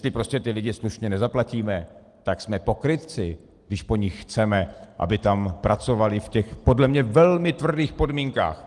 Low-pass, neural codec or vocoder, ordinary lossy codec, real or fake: 10.8 kHz; none; Opus, 24 kbps; real